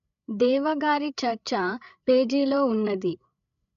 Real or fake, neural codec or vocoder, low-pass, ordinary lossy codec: fake; codec, 16 kHz, 8 kbps, FreqCodec, larger model; 7.2 kHz; none